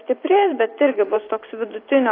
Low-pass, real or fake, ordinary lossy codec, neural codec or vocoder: 5.4 kHz; fake; AAC, 32 kbps; vocoder, 44.1 kHz, 128 mel bands every 512 samples, BigVGAN v2